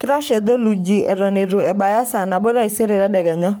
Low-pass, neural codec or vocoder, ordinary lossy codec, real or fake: none; codec, 44.1 kHz, 3.4 kbps, Pupu-Codec; none; fake